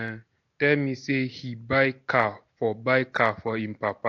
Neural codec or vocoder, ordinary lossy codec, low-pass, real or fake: none; AAC, 48 kbps; 7.2 kHz; real